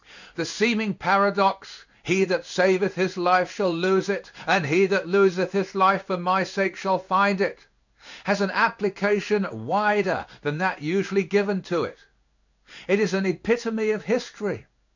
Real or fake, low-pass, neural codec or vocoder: fake; 7.2 kHz; codec, 16 kHz in and 24 kHz out, 1 kbps, XY-Tokenizer